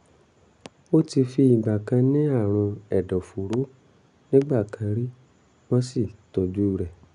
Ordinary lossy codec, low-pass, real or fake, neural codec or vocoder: none; 10.8 kHz; real; none